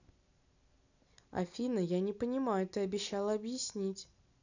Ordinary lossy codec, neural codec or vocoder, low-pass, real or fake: none; none; 7.2 kHz; real